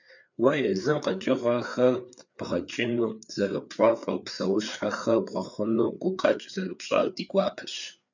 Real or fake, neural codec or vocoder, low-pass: fake; codec, 16 kHz, 4 kbps, FreqCodec, larger model; 7.2 kHz